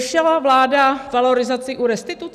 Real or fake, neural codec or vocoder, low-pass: real; none; 14.4 kHz